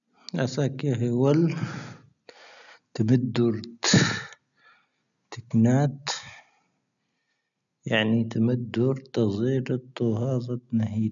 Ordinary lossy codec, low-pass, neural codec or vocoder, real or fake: none; 7.2 kHz; none; real